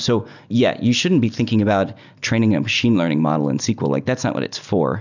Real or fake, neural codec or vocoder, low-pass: real; none; 7.2 kHz